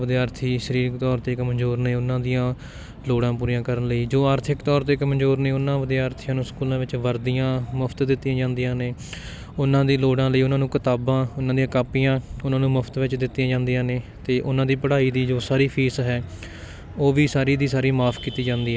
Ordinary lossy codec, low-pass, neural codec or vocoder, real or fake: none; none; none; real